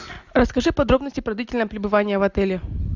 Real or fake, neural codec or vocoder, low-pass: real; none; 7.2 kHz